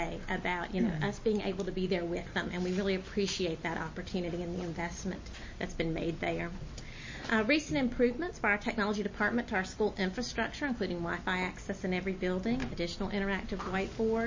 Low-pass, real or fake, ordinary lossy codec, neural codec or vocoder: 7.2 kHz; real; MP3, 32 kbps; none